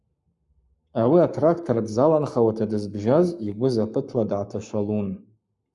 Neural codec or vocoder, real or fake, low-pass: codec, 44.1 kHz, 7.8 kbps, Pupu-Codec; fake; 10.8 kHz